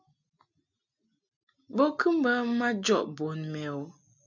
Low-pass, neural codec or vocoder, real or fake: 7.2 kHz; none; real